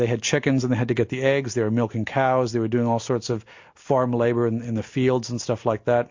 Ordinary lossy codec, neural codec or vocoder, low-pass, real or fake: MP3, 48 kbps; none; 7.2 kHz; real